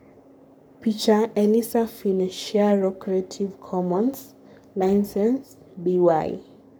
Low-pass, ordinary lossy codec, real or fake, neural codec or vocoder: none; none; fake; codec, 44.1 kHz, 7.8 kbps, Pupu-Codec